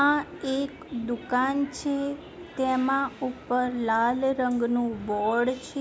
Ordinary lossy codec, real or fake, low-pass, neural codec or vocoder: none; real; none; none